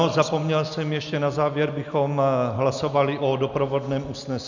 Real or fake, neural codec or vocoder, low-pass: real; none; 7.2 kHz